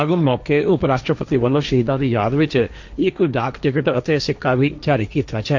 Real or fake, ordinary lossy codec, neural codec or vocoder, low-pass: fake; MP3, 64 kbps; codec, 16 kHz, 1.1 kbps, Voila-Tokenizer; 7.2 kHz